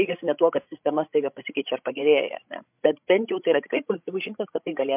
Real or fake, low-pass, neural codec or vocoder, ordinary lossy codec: fake; 3.6 kHz; codec, 16 kHz, 16 kbps, FreqCodec, larger model; MP3, 32 kbps